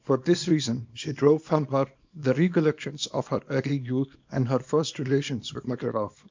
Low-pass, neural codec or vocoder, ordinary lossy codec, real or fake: 7.2 kHz; codec, 24 kHz, 0.9 kbps, WavTokenizer, small release; MP3, 64 kbps; fake